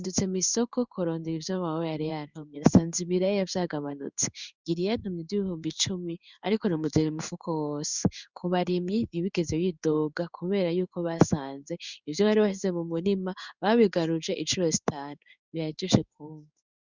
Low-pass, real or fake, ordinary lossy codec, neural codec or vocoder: 7.2 kHz; fake; Opus, 64 kbps; codec, 16 kHz in and 24 kHz out, 1 kbps, XY-Tokenizer